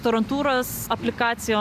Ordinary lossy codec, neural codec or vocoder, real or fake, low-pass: AAC, 96 kbps; none; real; 14.4 kHz